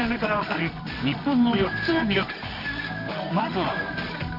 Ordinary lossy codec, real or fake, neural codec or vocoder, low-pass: none; fake; codec, 24 kHz, 0.9 kbps, WavTokenizer, medium music audio release; 5.4 kHz